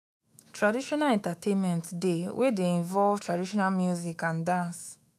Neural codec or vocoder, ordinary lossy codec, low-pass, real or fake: autoencoder, 48 kHz, 128 numbers a frame, DAC-VAE, trained on Japanese speech; none; 14.4 kHz; fake